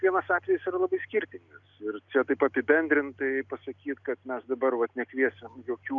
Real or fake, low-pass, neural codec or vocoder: real; 7.2 kHz; none